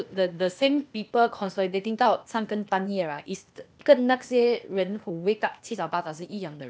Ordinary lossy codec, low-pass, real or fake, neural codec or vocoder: none; none; fake; codec, 16 kHz, 0.8 kbps, ZipCodec